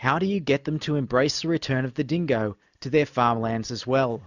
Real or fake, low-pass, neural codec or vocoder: real; 7.2 kHz; none